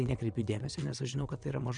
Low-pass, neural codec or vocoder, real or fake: 9.9 kHz; vocoder, 22.05 kHz, 80 mel bands, WaveNeXt; fake